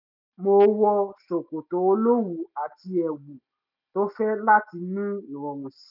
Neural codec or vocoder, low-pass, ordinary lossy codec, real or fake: none; 5.4 kHz; none; real